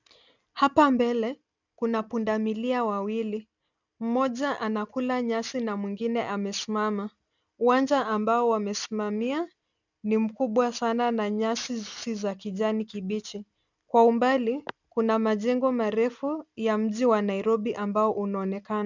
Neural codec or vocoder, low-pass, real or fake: none; 7.2 kHz; real